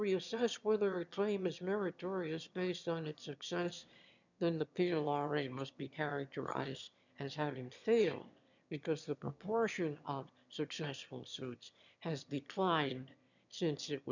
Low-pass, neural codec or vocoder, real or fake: 7.2 kHz; autoencoder, 22.05 kHz, a latent of 192 numbers a frame, VITS, trained on one speaker; fake